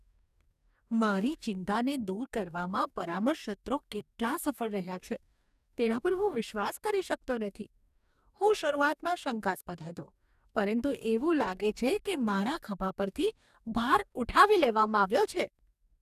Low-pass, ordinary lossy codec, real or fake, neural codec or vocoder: 14.4 kHz; none; fake; codec, 44.1 kHz, 2.6 kbps, DAC